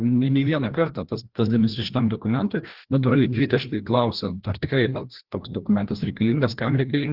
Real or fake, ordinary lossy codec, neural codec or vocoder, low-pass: fake; Opus, 16 kbps; codec, 16 kHz, 1 kbps, FreqCodec, larger model; 5.4 kHz